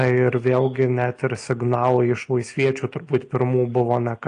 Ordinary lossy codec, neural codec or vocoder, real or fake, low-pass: AAC, 48 kbps; none; real; 9.9 kHz